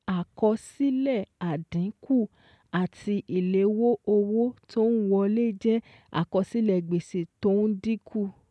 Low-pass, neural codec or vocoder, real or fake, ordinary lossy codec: none; none; real; none